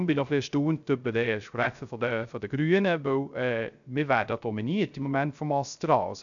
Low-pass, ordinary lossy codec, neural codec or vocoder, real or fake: 7.2 kHz; none; codec, 16 kHz, 0.3 kbps, FocalCodec; fake